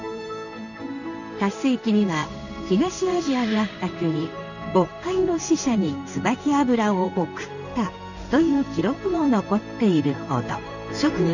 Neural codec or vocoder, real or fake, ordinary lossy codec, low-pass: codec, 16 kHz in and 24 kHz out, 1 kbps, XY-Tokenizer; fake; none; 7.2 kHz